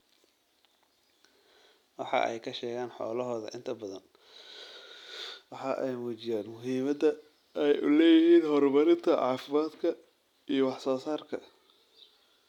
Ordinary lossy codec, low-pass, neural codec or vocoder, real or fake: none; 19.8 kHz; none; real